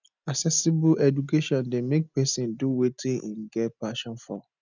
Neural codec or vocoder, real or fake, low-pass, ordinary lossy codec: none; real; 7.2 kHz; none